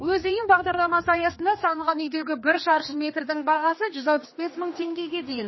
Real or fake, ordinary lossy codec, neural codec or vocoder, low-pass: fake; MP3, 24 kbps; codec, 16 kHz, 2 kbps, X-Codec, HuBERT features, trained on general audio; 7.2 kHz